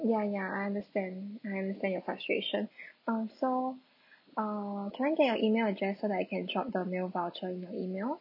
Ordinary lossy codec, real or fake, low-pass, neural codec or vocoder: none; real; 5.4 kHz; none